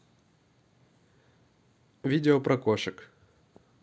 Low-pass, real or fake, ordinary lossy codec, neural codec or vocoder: none; real; none; none